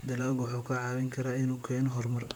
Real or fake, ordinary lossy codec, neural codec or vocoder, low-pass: fake; none; vocoder, 44.1 kHz, 128 mel bands, Pupu-Vocoder; none